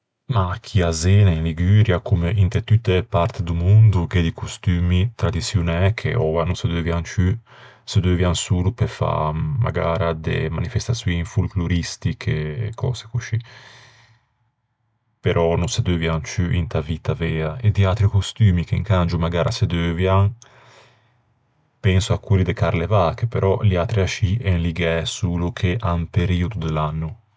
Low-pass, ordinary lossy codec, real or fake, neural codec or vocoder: none; none; real; none